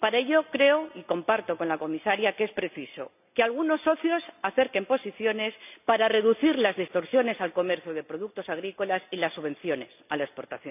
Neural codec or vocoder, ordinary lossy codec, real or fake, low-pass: none; none; real; 3.6 kHz